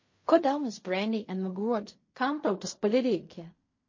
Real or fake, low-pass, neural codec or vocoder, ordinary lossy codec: fake; 7.2 kHz; codec, 16 kHz in and 24 kHz out, 0.4 kbps, LongCat-Audio-Codec, fine tuned four codebook decoder; MP3, 32 kbps